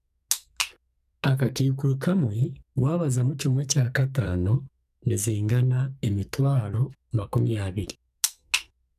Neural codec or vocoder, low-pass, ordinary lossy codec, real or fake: codec, 44.1 kHz, 2.6 kbps, SNAC; 14.4 kHz; none; fake